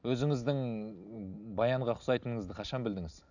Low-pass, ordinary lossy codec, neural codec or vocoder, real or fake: 7.2 kHz; none; none; real